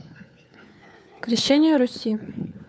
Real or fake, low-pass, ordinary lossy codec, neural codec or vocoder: fake; none; none; codec, 16 kHz, 4 kbps, FunCodec, trained on LibriTTS, 50 frames a second